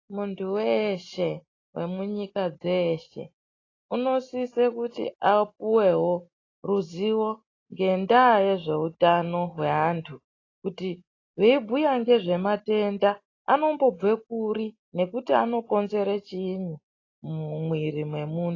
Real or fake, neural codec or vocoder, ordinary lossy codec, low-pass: real; none; AAC, 32 kbps; 7.2 kHz